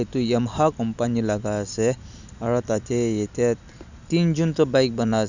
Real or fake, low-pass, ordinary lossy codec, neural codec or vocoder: real; 7.2 kHz; none; none